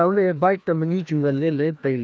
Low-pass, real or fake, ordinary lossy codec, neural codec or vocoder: none; fake; none; codec, 16 kHz, 1 kbps, FreqCodec, larger model